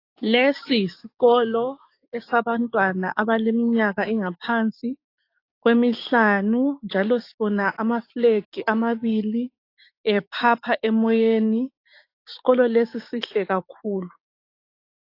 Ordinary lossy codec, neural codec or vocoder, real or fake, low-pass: AAC, 32 kbps; codec, 16 kHz, 6 kbps, DAC; fake; 5.4 kHz